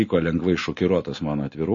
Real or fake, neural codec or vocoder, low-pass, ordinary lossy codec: real; none; 7.2 kHz; MP3, 32 kbps